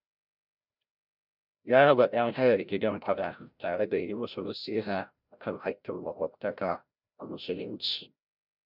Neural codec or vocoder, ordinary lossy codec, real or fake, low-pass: codec, 16 kHz, 0.5 kbps, FreqCodec, larger model; none; fake; 5.4 kHz